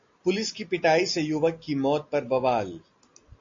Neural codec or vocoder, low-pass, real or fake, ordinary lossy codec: none; 7.2 kHz; real; AAC, 48 kbps